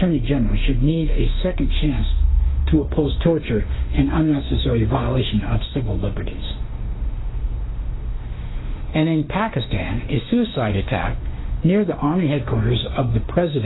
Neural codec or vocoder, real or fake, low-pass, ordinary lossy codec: autoencoder, 48 kHz, 32 numbers a frame, DAC-VAE, trained on Japanese speech; fake; 7.2 kHz; AAC, 16 kbps